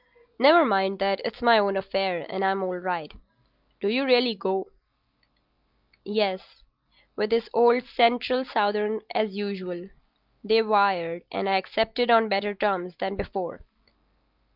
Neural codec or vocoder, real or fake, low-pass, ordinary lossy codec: none; real; 5.4 kHz; Opus, 24 kbps